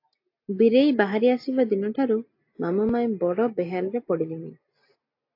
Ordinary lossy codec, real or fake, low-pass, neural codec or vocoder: AAC, 32 kbps; real; 5.4 kHz; none